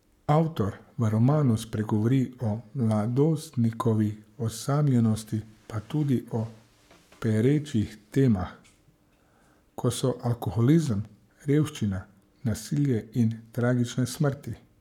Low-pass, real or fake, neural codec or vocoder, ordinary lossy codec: 19.8 kHz; fake; codec, 44.1 kHz, 7.8 kbps, Pupu-Codec; none